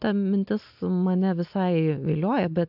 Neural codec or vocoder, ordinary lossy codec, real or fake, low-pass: none; AAC, 48 kbps; real; 5.4 kHz